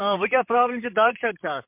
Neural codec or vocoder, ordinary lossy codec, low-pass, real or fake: vocoder, 44.1 kHz, 128 mel bands, Pupu-Vocoder; MP3, 32 kbps; 3.6 kHz; fake